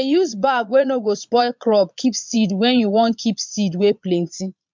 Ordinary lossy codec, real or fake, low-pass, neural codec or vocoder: MP3, 64 kbps; fake; 7.2 kHz; vocoder, 22.05 kHz, 80 mel bands, Vocos